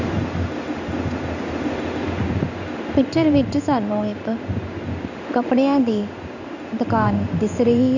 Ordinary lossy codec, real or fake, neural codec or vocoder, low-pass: none; fake; codec, 16 kHz in and 24 kHz out, 1 kbps, XY-Tokenizer; 7.2 kHz